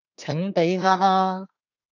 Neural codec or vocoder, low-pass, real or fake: codec, 24 kHz, 1 kbps, SNAC; 7.2 kHz; fake